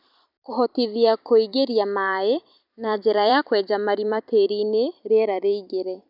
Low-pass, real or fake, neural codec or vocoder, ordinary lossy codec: 5.4 kHz; fake; vocoder, 44.1 kHz, 128 mel bands every 256 samples, BigVGAN v2; none